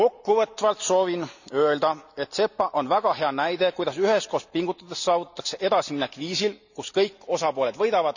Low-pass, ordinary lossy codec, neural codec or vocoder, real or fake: 7.2 kHz; none; none; real